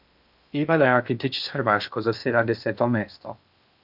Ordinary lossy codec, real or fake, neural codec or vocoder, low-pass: none; fake; codec, 16 kHz in and 24 kHz out, 0.6 kbps, FocalCodec, streaming, 2048 codes; 5.4 kHz